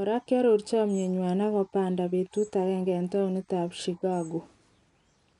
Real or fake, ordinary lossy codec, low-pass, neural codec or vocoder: real; none; 10.8 kHz; none